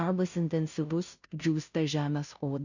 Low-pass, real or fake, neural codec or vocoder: 7.2 kHz; fake; codec, 16 kHz, 0.5 kbps, FunCodec, trained on Chinese and English, 25 frames a second